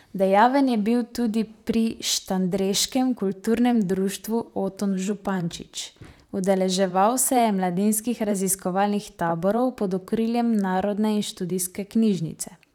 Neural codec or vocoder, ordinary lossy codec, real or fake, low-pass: vocoder, 44.1 kHz, 128 mel bands, Pupu-Vocoder; none; fake; 19.8 kHz